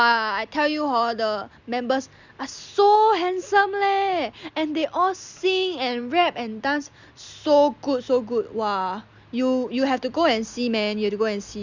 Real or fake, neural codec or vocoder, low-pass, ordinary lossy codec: real; none; 7.2 kHz; Opus, 64 kbps